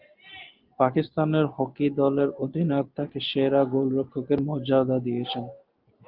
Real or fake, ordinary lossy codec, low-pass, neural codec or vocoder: real; Opus, 24 kbps; 5.4 kHz; none